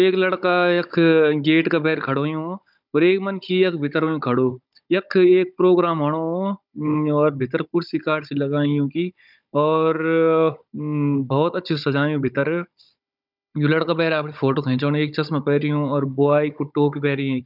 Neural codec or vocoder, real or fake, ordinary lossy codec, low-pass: codec, 16 kHz, 16 kbps, FunCodec, trained on Chinese and English, 50 frames a second; fake; none; 5.4 kHz